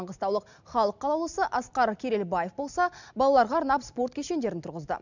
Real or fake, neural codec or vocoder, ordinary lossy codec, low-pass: real; none; none; 7.2 kHz